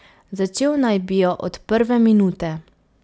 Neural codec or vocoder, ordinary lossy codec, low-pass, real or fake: none; none; none; real